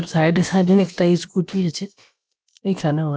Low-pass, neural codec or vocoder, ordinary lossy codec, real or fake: none; codec, 16 kHz, about 1 kbps, DyCAST, with the encoder's durations; none; fake